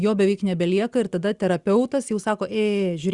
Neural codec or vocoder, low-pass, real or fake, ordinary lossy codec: none; 10.8 kHz; real; Opus, 64 kbps